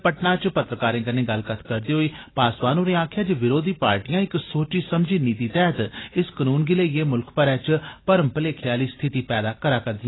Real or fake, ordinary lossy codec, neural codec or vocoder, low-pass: real; AAC, 16 kbps; none; 7.2 kHz